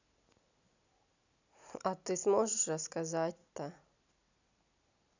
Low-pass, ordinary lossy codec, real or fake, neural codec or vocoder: 7.2 kHz; none; real; none